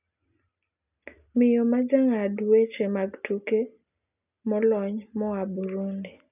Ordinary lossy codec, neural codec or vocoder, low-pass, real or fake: none; none; 3.6 kHz; real